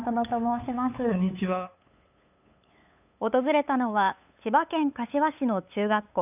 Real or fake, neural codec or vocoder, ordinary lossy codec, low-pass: fake; codec, 16 kHz, 8 kbps, FunCodec, trained on LibriTTS, 25 frames a second; none; 3.6 kHz